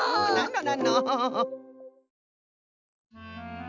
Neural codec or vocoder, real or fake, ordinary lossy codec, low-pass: none; real; none; 7.2 kHz